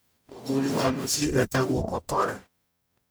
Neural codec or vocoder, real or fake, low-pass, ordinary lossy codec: codec, 44.1 kHz, 0.9 kbps, DAC; fake; none; none